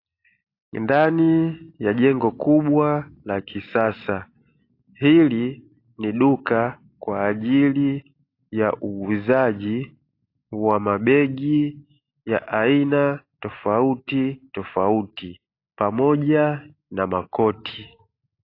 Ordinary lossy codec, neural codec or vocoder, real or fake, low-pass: AAC, 32 kbps; none; real; 5.4 kHz